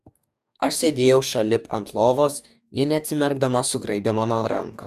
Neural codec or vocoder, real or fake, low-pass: codec, 44.1 kHz, 2.6 kbps, DAC; fake; 14.4 kHz